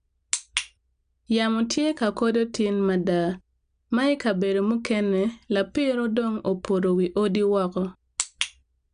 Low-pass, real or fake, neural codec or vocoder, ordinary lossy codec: 9.9 kHz; real; none; none